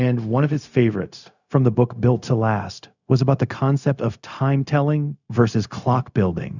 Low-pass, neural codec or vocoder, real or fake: 7.2 kHz; codec, 16 kHz, 0.4 kbps, LongCat-Audio-Codec; fake